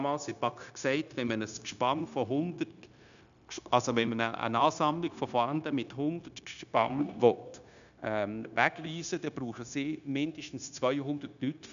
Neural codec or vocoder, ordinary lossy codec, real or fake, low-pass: codec, 16 kHz, 0.9 kbps, LongCat-Audio-Codec; none; fake; 7.2 kHz